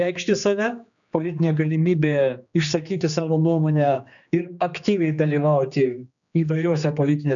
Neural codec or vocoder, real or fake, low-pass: codec, 16 kHz, 2 kbps, X-Codec, HuBERT features, trained on general audio; fake; 7.2 kHz